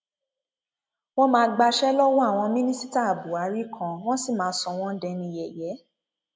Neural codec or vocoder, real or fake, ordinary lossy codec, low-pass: none; real; none; none